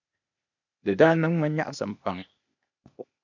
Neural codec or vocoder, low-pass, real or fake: codec, 16 kHz, 0.8 kbps, ZipCodec; 7.2 kHz; fake